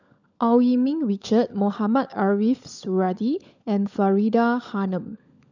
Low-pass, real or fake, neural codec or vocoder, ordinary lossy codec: 7.2 kHz; fake; codec, 16 kHz, 16 kbps, FunCodec, trained on LibriTTS, 50 frames a second; none